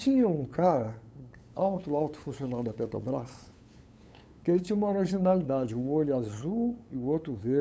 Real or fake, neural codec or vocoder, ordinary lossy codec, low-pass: fake; codec, 16 kHz, 8 kbps, FunCodec, trained on LibriTTS, 25 frames a second; none; none